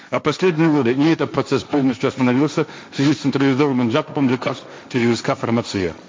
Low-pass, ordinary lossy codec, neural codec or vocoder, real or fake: none; none; codec, 16 kHz, 1.1 kbps, Voila-Tokenizer; fake